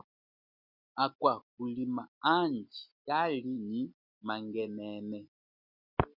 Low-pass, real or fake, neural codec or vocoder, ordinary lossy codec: 5.4 kHz; real; none; Opus, 64 kbps